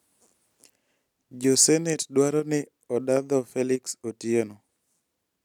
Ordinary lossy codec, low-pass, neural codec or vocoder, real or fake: none; 19.8 kHz; none; real